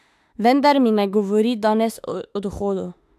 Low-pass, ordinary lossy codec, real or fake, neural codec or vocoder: 14.4 kHz; AAC, 96 kbps; fake; autoencoder, 48 kHz, 32 numbers a frame, DAC-VAE, trained on Japanese speech